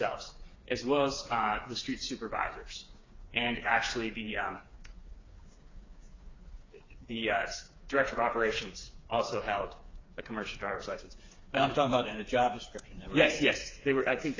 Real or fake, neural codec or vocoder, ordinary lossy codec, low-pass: fake; codec, 16 kHz, 4 kbps, FreqCodec, smaller model; AAC, 32 kbps; 7.2 kHz